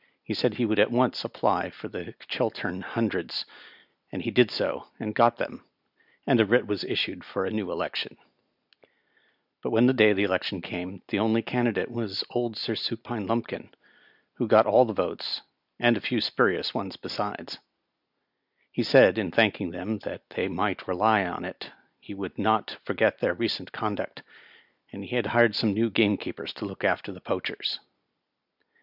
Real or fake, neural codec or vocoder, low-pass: real; none; 5.4 kHz